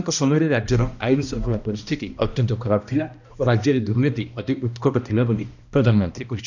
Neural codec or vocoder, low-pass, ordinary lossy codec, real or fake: codec, 16 kHz, 1 kbps, X-Codec, HuBERT features, trained on balanced general audio; 7.2 kHz; none; fake